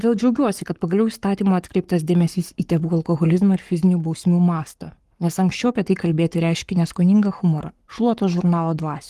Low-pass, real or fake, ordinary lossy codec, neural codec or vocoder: 14.4 kHz; fake; Opus, 24 kbps; codec, 44.1 kHz, 7.8 kbps, Pupu-Codec